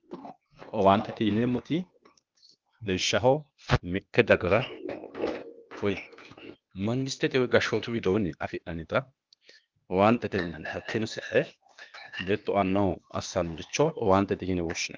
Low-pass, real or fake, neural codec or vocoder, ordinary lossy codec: 7.2 kHz; fake; codec, 16 kHz, 0.8 kbps, ZipCodec; Opus, 24 kbps